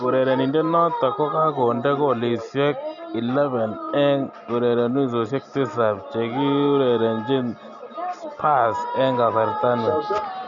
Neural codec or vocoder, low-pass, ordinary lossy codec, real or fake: none; 7.2 kHz; none; real